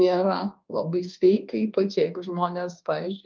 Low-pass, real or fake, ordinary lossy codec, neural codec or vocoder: 7.2 kHz; fake; Opus, 32 kbps; codec, 24 kHz, 1.2 kbps, DualCodec